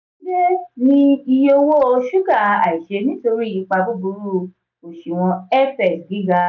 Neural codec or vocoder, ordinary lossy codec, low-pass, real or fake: none; none; 7.2 kHz; real